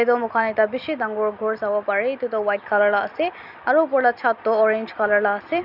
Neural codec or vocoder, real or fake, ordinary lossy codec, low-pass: none; real; none; 5.4 kHz